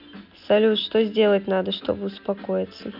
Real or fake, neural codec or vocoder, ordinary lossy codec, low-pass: real; none; none; 5.4 kHz